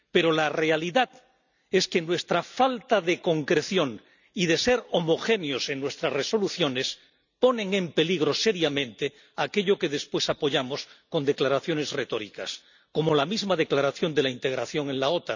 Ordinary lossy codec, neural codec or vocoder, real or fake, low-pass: none; none; real; 7.2 kHz